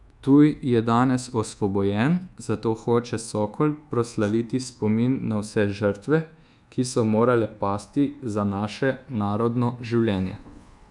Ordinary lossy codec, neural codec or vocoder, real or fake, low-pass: none; codec, 24 kHz, 1.2 kbps, DualCodec; fake; 10.8 kHz